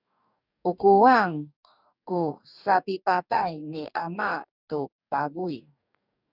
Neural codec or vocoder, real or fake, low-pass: codec, 44.1 kHz, 2.6 kbps, DAC; fake; 5.4 kHz